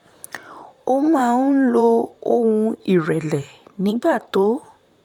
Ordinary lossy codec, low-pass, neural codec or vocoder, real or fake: none; 19.8 kHz; vocoder, 44.1 kHz, 128 mel bands, Pupu-Vocoder; fake